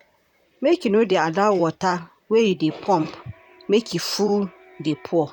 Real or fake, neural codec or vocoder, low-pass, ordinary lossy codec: fake; vocoder, 44.1 kHz, 128 mel bands, Pupu-Vocoder; 19.8 kHz; none